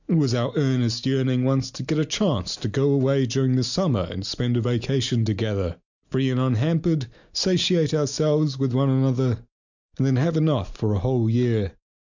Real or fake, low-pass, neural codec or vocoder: real; 7.2 kHz; none